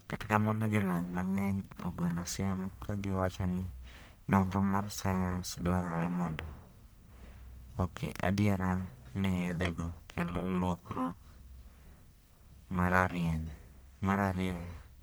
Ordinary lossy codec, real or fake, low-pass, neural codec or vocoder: none; fake; none; codec, 44.1 kHz, 1.7 kbps, Pupu-Codec